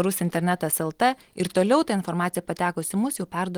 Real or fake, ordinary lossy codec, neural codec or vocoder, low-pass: real; Opus, 24 kbps; none; 19.8 kHz